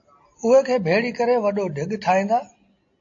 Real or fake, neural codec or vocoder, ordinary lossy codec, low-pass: real; none; AAC, 48 kbps; 7.2 kHz